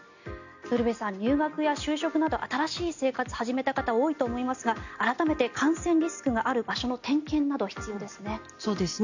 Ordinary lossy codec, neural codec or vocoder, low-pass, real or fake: none; none; 7.2 kHz; real